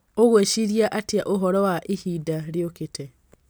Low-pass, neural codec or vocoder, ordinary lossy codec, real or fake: none; none; none; real